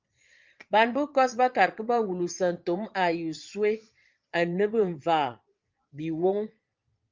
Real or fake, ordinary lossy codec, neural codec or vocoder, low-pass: fake; Opus, 24 kbps; vocoder, 22.05 kHz, 80 mel bands, Vocos; 7.2 kHz